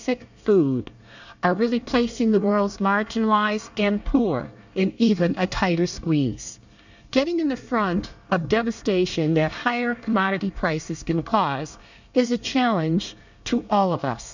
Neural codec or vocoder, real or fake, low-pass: codec, 24 kHz, 1 kbps, SNAC; fake; 7.2 kHz